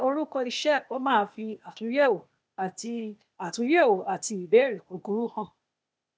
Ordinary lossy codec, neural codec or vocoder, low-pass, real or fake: none; codec, 16 kHz, 0.8 kbps, ZipCodec; none; fake